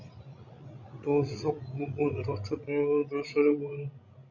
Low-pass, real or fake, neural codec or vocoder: 7.2 kHz; fake; codec, 16 kHz, 16 kbps, FreqCodec, larger model